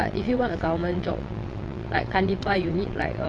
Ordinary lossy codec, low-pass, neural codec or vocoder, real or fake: AAC, 48 kbps; 9.9 kHz; vocoder, 22.05 kHz, 80 mel bands, WaveNeXt; fake